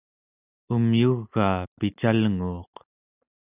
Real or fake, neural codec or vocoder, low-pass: real; none; 3.6 kHz